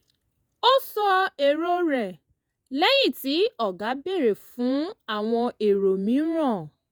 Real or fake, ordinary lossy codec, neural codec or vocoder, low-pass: fake; none; vocoder, 48 kHz, 128 mel bands, Vocos; none